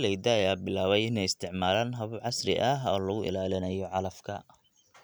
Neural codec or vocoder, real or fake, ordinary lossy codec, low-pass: none; real; none; none